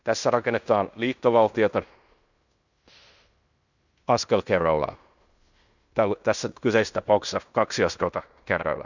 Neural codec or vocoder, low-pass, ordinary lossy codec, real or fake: codec, 16 kHz in and 24 kHz out, 0.9 kbps, LongCat-Audio-Codec, fine tuned four codebook decoder; 7.2 kHz; none; fake